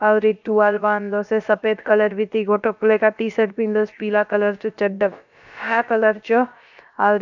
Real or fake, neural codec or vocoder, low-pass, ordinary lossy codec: fake; codec, 16 kHz, about 1 kbps, DyCAST, with the encoder's durations; 7.2 kHz; none